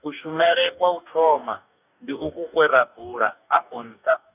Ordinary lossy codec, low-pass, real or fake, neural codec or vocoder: none; 3.6 kHz; fake; codec, 44.1 kHz, 2.6 kbps, DAC